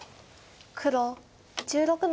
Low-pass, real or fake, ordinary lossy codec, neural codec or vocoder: none; real; none; none